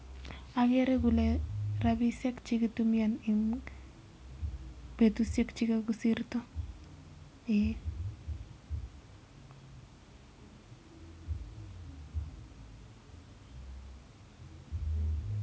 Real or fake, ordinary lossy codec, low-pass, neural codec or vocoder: real; none; none; none